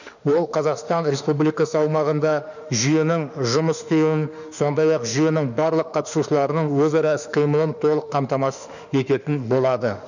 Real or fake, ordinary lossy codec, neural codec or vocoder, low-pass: fake; none; autoencoder, 48 kHz, 32 numbers a frame, DAC-VAE, trained on Japanese speech; 7.2 kHz